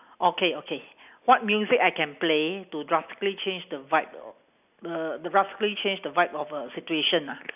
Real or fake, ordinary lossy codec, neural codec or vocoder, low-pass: real; none; none; 3.6 kHz